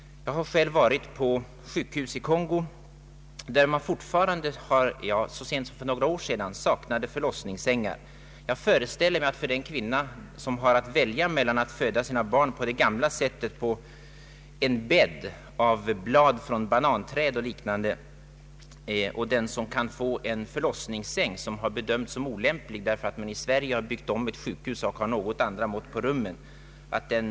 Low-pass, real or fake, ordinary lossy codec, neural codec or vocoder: none; real; none; none